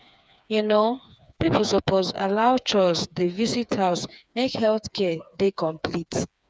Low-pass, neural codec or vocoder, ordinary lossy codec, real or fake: none; codec, 16 kHz, 4 kbps, FreqCodec, smaller model; none; fake